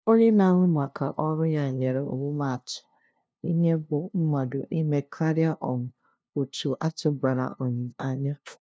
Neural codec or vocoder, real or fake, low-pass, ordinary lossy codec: codec, 16 kHz, 0.5 kbps, FunCodec, trained on LibriTTS, 25 frames a second; fake; none; none